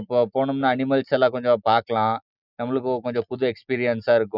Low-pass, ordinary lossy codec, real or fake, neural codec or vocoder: 5.4 kHz; none; real; none